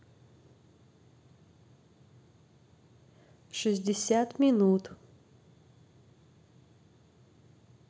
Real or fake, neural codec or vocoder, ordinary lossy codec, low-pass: real; none; none; none